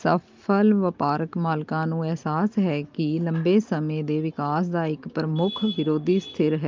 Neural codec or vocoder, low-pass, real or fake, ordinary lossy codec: none; 7.2 kHz; real; Opus, 24 kbps